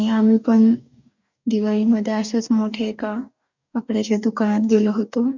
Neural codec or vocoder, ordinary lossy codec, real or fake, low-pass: codec, 44.1 kHz, 2.6 kbps, DAC; none; fake; 7.2 kHz